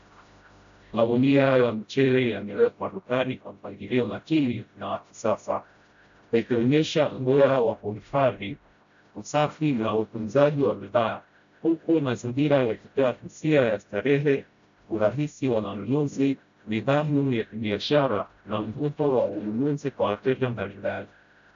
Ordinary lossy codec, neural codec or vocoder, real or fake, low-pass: AAC, 64 kbps; codec, 16 kHz, 0.5 kbps, FreqCodec, smaller model; fake; 7.2 kHz